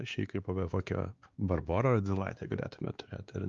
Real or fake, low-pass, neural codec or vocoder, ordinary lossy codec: fake; 7.2 kHz; codec, 16 kHz, 2 kbps, X-Codec, WavLM features, trained on Multilingual LibriSpeech; Opus, 32 kbps